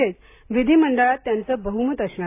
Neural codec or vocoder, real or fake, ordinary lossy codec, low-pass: none; real; AAC, 24 kbps; 3.6 kHz